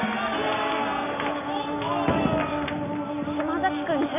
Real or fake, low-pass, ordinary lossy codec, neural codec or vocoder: real; 3.6 kHz; none; none